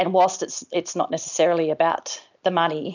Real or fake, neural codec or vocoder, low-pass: real; none; 7.2 kHz